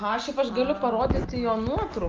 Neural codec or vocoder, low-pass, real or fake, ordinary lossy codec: none; 7.2 kHz; real; Opus, 32 kbps